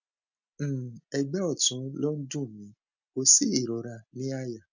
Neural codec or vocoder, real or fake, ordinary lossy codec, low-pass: none; real; none; 7.2 kHz